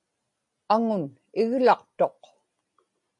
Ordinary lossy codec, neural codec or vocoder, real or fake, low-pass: MP3, 48 kbps; none; real; 10.8 kHz